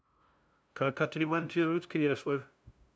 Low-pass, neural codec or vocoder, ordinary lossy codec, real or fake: none; codec, 16 kHz, 0.5 kbps, FunCodec, trained on LibriTTS, 25 frames a second; none; fake